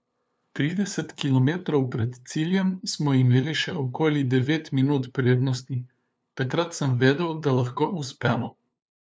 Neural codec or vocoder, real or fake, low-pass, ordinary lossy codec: codec, 16 kHz, 2 kbps, FunCodec, trained on LibriTTS, 25 frames a second; fake; none; none